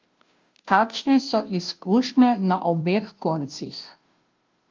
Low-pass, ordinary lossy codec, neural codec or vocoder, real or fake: 7.2 kHz; Opus, 32 kbps; codec, 16 kHz, 0.5 kbps, FunCodec, trained on Chinese and English, 25 frames a second; fake